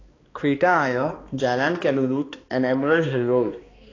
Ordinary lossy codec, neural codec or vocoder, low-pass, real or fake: AAC, 32 kbps; codec, 16 kHz, 2 kbps, X-Codec, HuBERT features, trained on balanced general audio; 7.2 kHz; fake